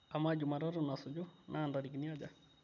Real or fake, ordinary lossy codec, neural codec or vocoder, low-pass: real; none; none; 7.2 kHz